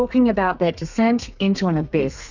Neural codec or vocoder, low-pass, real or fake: codec, 32 kHz, 1.9 kbps, SNAC; 7.2 kHz; fake